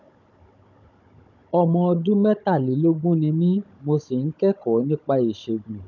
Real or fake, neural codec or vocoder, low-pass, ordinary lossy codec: fake; codec, 16 kHz, 16 kbps, FunCodec, trained on Chinese and English, 50 frames a second; 7.2 kHz; none